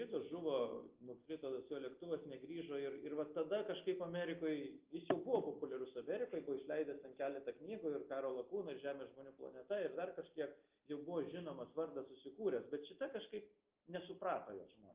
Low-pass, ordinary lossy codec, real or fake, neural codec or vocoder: 3.6 kHz; Opus, 32 kbps; real; none